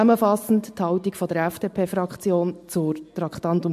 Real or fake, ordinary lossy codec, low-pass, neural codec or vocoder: real; MP3, 64 kbps; 14.4 kHz; none